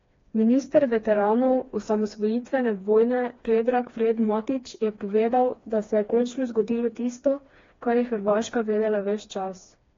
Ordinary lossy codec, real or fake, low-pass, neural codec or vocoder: AAC, 32 kbps; fake; 7.2 kHz; codec, 16 kHz, 2 kbps, FreqCodec, smaller model